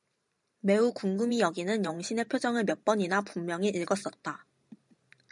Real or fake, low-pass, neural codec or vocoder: fake; 10.8 kHz; vocoder, 44.1 kHz, 128 mel bands every 512 samples, BigVGAN v2